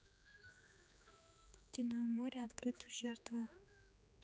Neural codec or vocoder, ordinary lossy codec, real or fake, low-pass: codec, 16 kHz, 2 kbps, X-Codec, HuBERT features, trained on general audio; none; fake; none